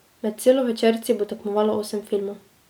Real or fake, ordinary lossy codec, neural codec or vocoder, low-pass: real; none; none; none